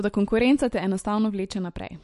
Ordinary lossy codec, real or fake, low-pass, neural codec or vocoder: MP3, 48 kbps; real; 14.4 kHz; none